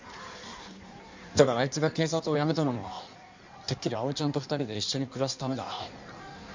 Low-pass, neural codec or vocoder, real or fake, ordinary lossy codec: 7.2 kHz; codec, 16 kHz in and 24 kHz out, 1.1 kbps, FireRedTTS-2 codec; fake; MP3, 64 kbps